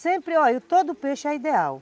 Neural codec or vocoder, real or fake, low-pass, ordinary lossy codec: none; real; none; none